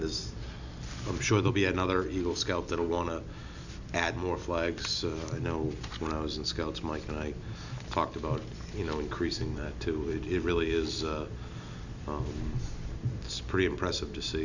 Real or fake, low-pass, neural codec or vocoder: real; 7.2 kHz; none